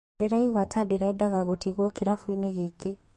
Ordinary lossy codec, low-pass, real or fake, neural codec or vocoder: MP3, 48 kbps; 14.4 kHz; fake; codec, 32 kHz, 1.9 kbps, SNAC